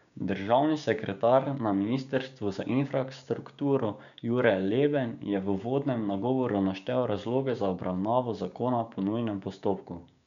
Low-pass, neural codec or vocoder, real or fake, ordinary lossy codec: 7.2 kHz; codec, 16 kHz, 6 kbps, DAC; fake; none